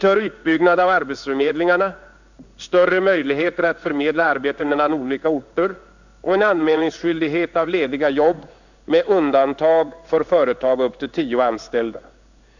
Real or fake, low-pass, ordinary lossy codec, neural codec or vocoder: fake; 7.2 kHz; none; codec, 16 kHz in and 24 kHz out, 1 kbps, XY-Tokenizer